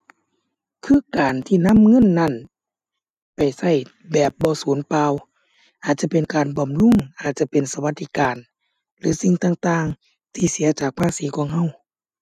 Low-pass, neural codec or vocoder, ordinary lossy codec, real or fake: 14.4 kHz; none; none; real